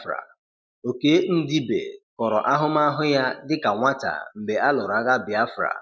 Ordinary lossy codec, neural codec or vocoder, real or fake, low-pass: none; none; real; none